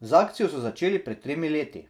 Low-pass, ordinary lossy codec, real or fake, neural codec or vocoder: 19.8 kHz; none; fake; vocoder, 44.1 kHz, 128 mel bands every 256 samples, BigVGAN v2